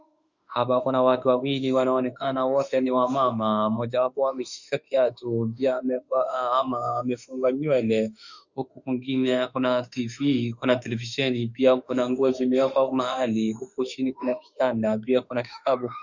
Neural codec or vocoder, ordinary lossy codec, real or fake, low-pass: autoencoder, 48 kHz, 32 numbers a frame, DAC-VAE, trained on Japanese speech; Opus, 64 kbps; fake; 7.2 kHz